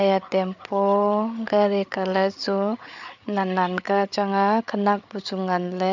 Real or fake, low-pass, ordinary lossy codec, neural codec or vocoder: fake; 7.2 kHz; none; codec, 16 kHz, 8 kbps, FreqCodec, larger model